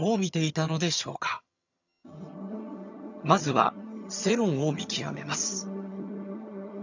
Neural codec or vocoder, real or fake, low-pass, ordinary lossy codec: vocoder, 22.05 kHz, 80 mel bands, HiFi-GAN; fake; 7.2 kHz; none